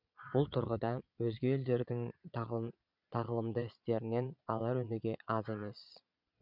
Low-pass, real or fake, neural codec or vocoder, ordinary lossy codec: 5.4 kHz; fake; vocoder, 22.05 kHz, 80 mel bands, Vocos; none